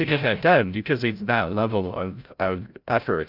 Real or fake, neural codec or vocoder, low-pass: fake; codec, 16 kHz, 0.5 kbps, FreqCodec, larger model; 5.4 kHz